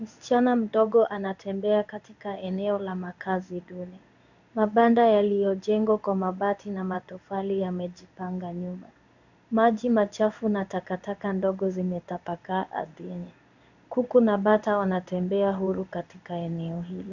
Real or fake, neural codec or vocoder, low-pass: fake; codec, 16 kHz in and 24 kHz out, 1 kbps, XY-Tokenizer; 7.2 kHz